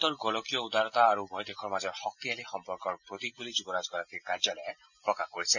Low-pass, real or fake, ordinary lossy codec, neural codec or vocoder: 7.2 kHz; real; none; none